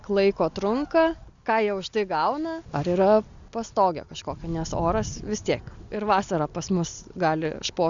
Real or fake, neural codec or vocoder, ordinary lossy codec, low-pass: real; none; Opus, 64 kbps; 7.2 kHz